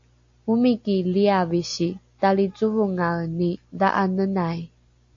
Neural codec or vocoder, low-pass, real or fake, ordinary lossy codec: none; 7.2 kHz; real; AAC, 48 kbps